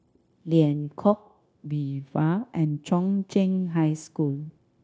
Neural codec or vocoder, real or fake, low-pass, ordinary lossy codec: codec, 16 kHz, 0.9 kbps, LongCat-Audio-Codec; fake; none; none